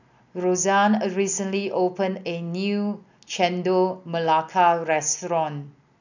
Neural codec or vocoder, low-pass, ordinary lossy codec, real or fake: none; 7.2 kHz; none; real